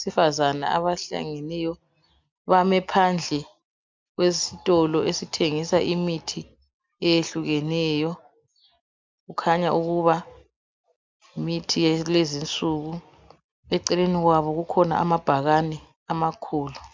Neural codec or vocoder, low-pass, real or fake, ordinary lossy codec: none; 7.2 kHz; real; MP3, 64 kbps